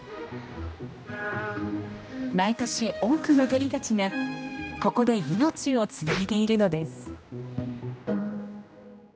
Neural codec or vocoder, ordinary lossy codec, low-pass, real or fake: codec, 16 kHz, 1 kbps, X-Codec, HuBERT features, trained on general audio; none; none; fake